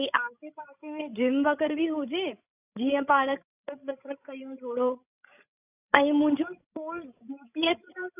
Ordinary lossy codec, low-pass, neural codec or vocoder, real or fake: none; 3.6 kHz; codec, 16 kHz, 16 kbps, FreqCodec, larger model; fake